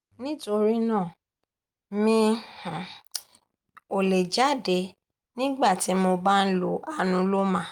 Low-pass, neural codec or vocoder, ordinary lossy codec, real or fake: 19.8 kHz; none; Opus, 32 kbps; real